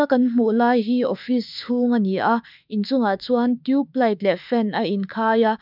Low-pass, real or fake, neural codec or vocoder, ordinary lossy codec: 5.4 kHz; fake; autoencoder, 48 kHz, 32 numbers a frame, DAC-VAE, trained on Japanese speech; none